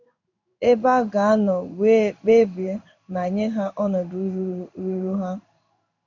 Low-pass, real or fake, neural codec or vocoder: 7.2 kHz; fake; codec, 16 kHz in and 24 kHz out, 1 kbps, XY-Tokenizer